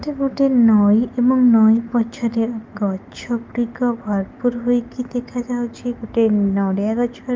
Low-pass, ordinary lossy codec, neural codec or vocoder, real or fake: 7.2 kHz; Opus, 32 kbps; none; real